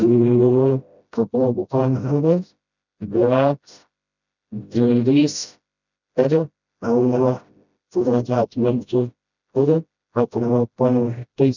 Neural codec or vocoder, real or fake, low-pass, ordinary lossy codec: codec, 16 kHz, 0.5 kbps, FreqCodec, smaller model; fake; 7.2 kHz; none